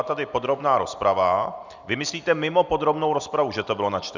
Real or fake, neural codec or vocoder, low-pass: real; none; 7.2 kHz